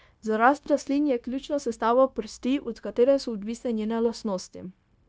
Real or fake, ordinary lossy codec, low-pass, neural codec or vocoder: fake; none; none; codec, 16 kHz, 0.9 kbps, LongCat-Audio-Codec